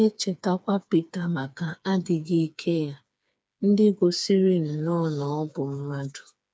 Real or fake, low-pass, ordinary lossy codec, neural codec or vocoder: fake; none; none; codec, 16 kHz, 4 kbps, FreqCodec, smaller model